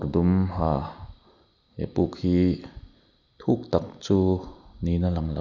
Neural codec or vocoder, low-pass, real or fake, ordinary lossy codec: none; 7.2 kHz; real; none